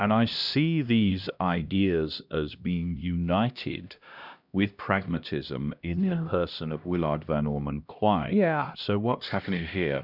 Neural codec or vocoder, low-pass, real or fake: codec, 16 kHz, 1 kbps, X-Codec, HuBERT features, trained on LibriSpeech; 5.4 kHz; fake